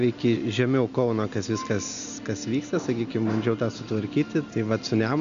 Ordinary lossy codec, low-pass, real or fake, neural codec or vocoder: MP3, 64 kbps; 7.2 kHz; real; none